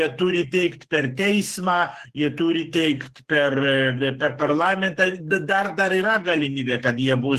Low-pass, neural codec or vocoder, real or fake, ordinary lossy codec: 14.4 kHz; codec, 44.1 kHz, 2.6 kbps, SNAC; fake; Opus, 16 kbps